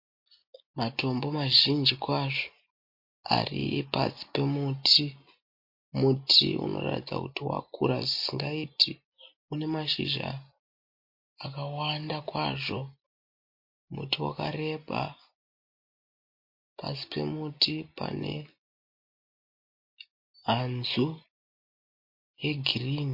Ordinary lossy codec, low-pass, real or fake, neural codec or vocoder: MP3, 32 kbps; 5.4 kHz; real; none